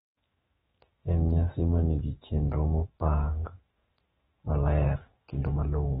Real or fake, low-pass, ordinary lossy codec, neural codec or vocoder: fake; 19.8 kHz; AAC, 16 kbps; autoencoder, 48 kHz, 128 numbers a frame, DAC-VAE, trained on Japanese speech